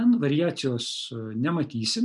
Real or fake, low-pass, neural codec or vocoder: real; 10.8 kHz; none